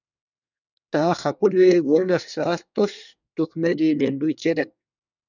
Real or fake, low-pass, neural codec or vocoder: fake; 7.2 kHz; codec, 24 kHz, 1 kbps, SNAC